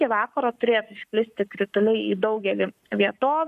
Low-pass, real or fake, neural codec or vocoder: 14.4 kHz; fake; codec, 44.1 kHz, 7.8 kbps, Pupu-Codec